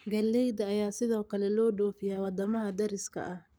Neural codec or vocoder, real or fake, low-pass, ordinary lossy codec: codec, 44.1 kHz, 7.8 kbps, Pupu-Codec; fake; none; none